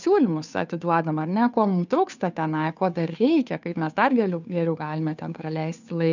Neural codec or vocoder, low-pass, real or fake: codec, 16 kHz, 2 kbps, FunCodec, trained on Chinese and English, 25 frames a second; 7.2 kHz; fake